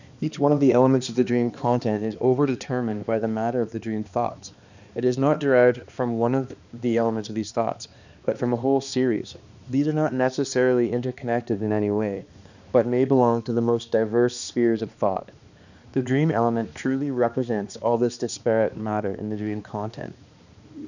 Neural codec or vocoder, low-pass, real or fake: codec, 16 kHz, 2 kbps, X-Codec, HuBERT features, trained on balanced general audio; 7.2 kHz; fake